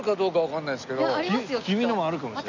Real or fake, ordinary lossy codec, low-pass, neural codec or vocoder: real; none; 7.2 kHz; none